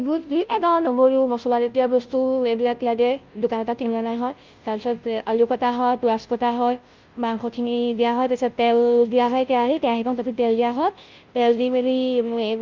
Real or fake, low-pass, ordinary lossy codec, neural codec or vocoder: fake; 7.2 kHz; Opus, 32 kbps; codec, 16 kHz, 0.5 kbps, FunCodec, trained on Chinese and English, 25 frames a second